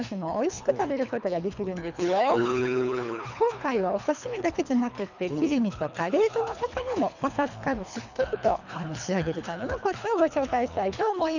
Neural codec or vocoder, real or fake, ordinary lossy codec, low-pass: codec, 24 kHz, 3 kbps, HILCodec; fake; none; 7.2 kHz